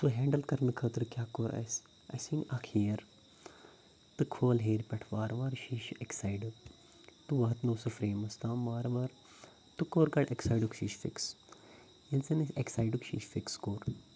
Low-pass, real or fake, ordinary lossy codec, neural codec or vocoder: none; real; none; none